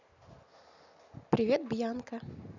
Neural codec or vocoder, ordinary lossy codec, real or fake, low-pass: none; none; real; 7.2 kHz